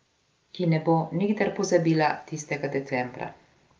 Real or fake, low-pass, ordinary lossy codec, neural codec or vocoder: real; 7.2 kHz; Opus, 32 kbps; none